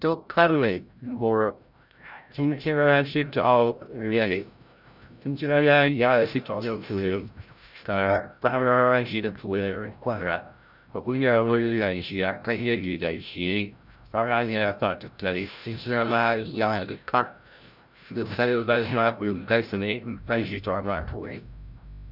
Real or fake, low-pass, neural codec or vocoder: fake; 5.4 kHz; codec, 16 kHz, 0.5 kbps, FreqCodec, larger model